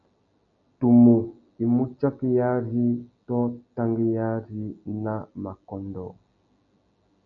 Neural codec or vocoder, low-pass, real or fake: none; 7.2 kHz; real